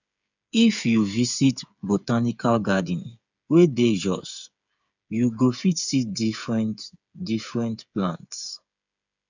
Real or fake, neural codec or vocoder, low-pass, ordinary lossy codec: fake; codec, 16 kHz, 8 kbps, FreqCodec, smaller model; 7.2 kHz; none